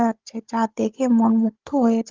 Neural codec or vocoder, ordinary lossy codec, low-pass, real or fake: codec, 16 kHz, 16 kbps, FunCodec, trained on Chinese and English, 50 frames a second; Opus, 16 kbps; 7.2 kHz; fake